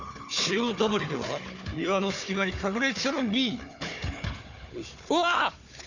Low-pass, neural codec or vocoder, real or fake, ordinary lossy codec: 7.2 kHz; codec, 16 kHz, 4 kbps, FunCodec, trained on Chinese and English, 50 frames a second; fake; none